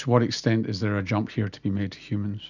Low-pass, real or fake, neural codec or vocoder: 7.2 kHz; real; none